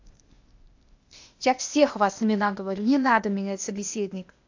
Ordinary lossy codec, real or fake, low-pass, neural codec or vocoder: AAC, 48 kbps; fake; 7.2 kHz; codec, 16 kHz, 0.8 kbps, ZipCodec